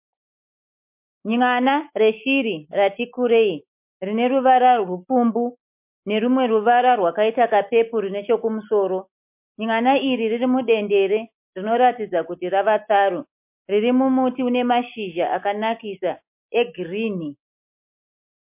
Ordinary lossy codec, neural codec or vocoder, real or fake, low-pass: MP3, 32 kbps; none; real; 3.6 kHz